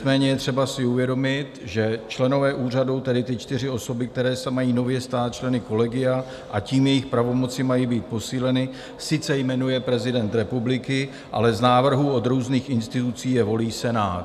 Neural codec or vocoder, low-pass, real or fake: none; 14.4 kHz; real